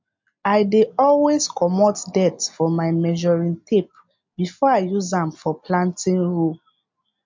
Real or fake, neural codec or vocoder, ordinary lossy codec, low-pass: real; none; MP3, 48 kbps; 7.2 kHz